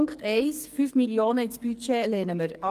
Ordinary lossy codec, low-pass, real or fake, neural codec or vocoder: Opus, 32 kbps; 14.4 kHz; fake; codec, 44.1 kHz, 2.6 kbps, SNAC